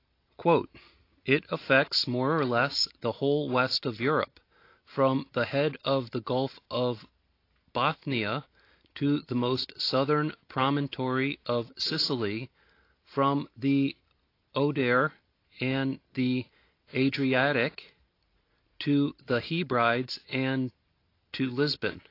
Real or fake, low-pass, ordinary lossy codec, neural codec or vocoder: real; 5.4 kHz; AAC, 32 kbps; none